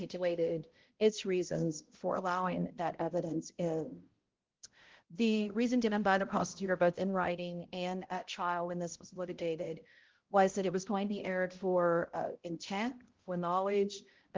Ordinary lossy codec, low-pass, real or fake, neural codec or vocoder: Opus, 16 kbps; 7.2 kHz; fake; codec, 16 kHz, 0.5 kbps, X-Codec, HuBERT features, trained on balanced general audio